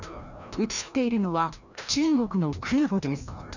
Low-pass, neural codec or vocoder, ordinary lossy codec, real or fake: 7.2 kHz; codec, 16 kHz, 1 kbps, FreqCodec, larger model; none; fake